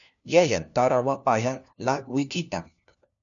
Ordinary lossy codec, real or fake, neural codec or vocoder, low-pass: MP3, 96 kbps; fake; codec, 16 kHz, 1 kbps, FunCodec, trained on LibriTTS, 50 frames a second; 7.2 kHz